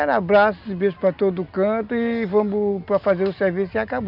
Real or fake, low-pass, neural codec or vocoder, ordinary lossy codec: real; 5.4 kHz; none; none